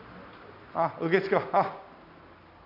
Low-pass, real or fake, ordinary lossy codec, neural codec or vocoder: 5.4 kHz; real; none; none